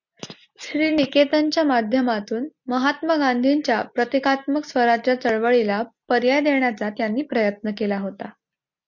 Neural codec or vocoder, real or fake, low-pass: none; real; 7.2 kHz